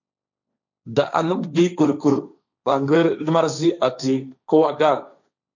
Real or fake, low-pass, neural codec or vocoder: fake; 7.2 kHz; codec, 16 kHz, 1.1 kbps, Voila-Tokenizer